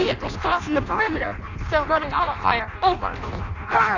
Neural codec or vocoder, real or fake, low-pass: codec, 16 kHz in and 24 kHz out, 0.6 kbps, FireRedTTS-2 codec; fake; 7.2 kHz